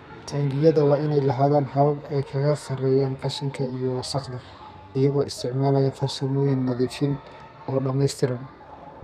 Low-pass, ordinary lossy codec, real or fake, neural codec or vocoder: 14.4 kHz; none; fake; codec, 32 kHz, 1.9 kbps, SNAC